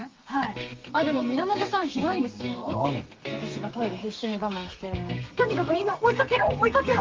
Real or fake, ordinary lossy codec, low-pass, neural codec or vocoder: fake; Opus, 32 kbps; 7.2 kHz; codec, 32 kHz, 1.9 kbps, SNAC